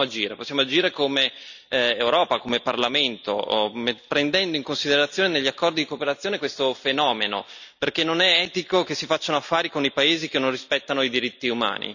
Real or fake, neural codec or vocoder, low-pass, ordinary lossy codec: real; none; 7.2 kHz; none